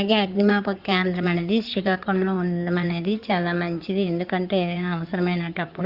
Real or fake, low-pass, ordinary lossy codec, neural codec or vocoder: fake; 5.4 kHz; Opus, 64 kbps; codec, 16 kHz in and 24 kHz out, 2.2 kbps, FireRedTTS-2 codec